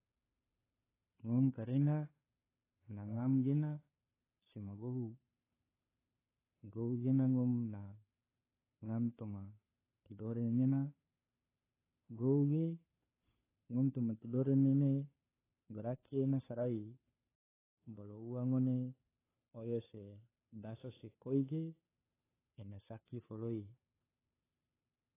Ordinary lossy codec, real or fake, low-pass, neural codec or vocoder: AAC, 16 kbps; fake; 3.6 kHz; codec, 16 kHz, 2 kbps, FunCodec, trained on Chinese and English, 25 frames a second